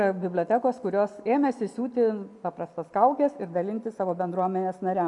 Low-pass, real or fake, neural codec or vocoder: 10.8 kHz; real; none